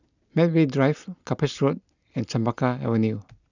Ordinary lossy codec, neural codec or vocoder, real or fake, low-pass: none; none; real; 7.2 kHz